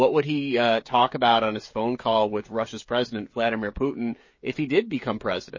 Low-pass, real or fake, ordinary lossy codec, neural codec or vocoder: 7.2 kHz; fake; MP3, 32 kbps; codec, 16 kHz, 16 kbps, FreqCodec, smaller model